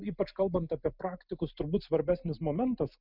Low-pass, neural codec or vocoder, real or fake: 5.4 kHz; none; real